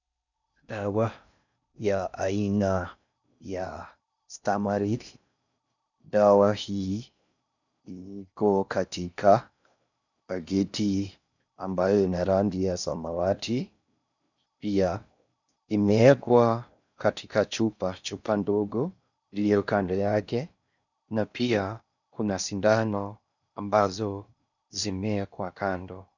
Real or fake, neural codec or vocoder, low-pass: fake; codec, 16 kHz in and 24 kHz out, 0.6 kbps, FocalCodec, streaming, 4096 codes; 7.2 kHz